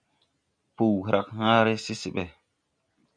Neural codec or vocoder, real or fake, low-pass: none; real; 9.9 kHz